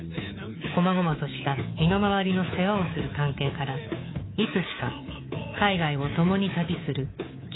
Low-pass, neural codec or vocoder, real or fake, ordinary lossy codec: 7.2 kHz; codec, 24 kHz, 3.1 kbps, DualCodec; fake; AAC, 16 kbps